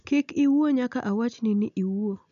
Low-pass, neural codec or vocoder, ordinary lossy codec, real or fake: 7.2 kHz; none; none; real